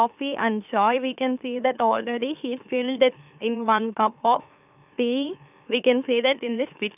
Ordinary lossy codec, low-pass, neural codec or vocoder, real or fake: none; 3.6 kHz; autoencoder, 44.1 kHz, a latent of 192 numbers a frame, MeloTTS; fake